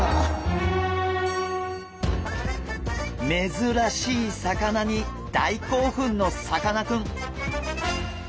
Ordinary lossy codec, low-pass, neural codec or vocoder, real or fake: none; none; none; real